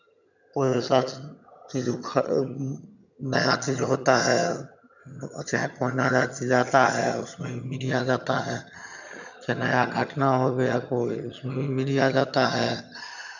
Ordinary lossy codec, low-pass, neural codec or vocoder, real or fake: none; 7.2 kHz; vocoder, 22.05 kHz, 80 mel bands, HiFi-GAN; fake